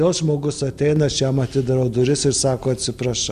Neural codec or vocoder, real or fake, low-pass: none; real; 14.4 kHz